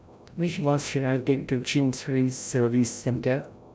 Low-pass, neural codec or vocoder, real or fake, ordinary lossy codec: none; codec, 16 kHz, 0.5 kbps, FreqCodec, larger model; fake; none